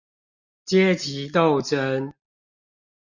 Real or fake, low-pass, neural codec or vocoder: real; 7.2 kHz; none